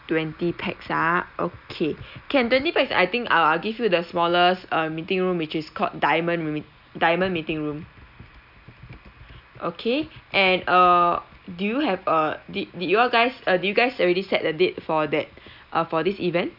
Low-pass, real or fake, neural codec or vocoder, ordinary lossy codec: 5.4 kHz; real; none; none